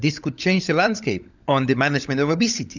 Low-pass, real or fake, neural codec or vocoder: 7.2 kHz; fake; codec, 16 kHz, 16 kbps, FunCodec, trained on LibriTTS, 50 frames a second